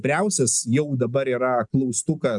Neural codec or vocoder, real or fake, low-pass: none; real; 10.8 kHz